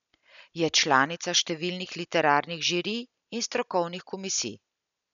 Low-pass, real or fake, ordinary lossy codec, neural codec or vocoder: 7.2 kHz; real; none; none